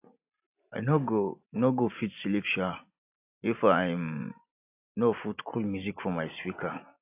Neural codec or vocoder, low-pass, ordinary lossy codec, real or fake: none; 3.6 kHz; AAC, 32 kbps; real